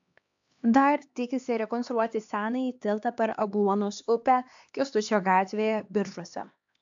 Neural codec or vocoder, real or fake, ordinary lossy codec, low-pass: codec, 16 kHz, 2 kbps, X-Codec, HuBERT features, trained on LibriSpeech; fake; AAC, 64 kbps; 7.2 kHz